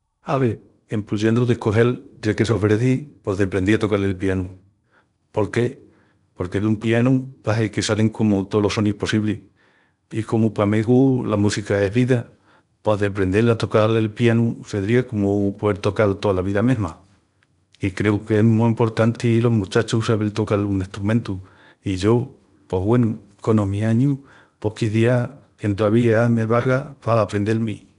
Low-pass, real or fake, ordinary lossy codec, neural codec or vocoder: 10.8 kHz; fake; none; codec, 16 kHz in and 24 kHz out, 0.8 kbps, FocalCodec, streaming, 65536 codes